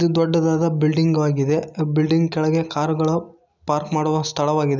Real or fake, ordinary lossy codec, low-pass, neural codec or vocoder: real; none; 7.2 kHz; none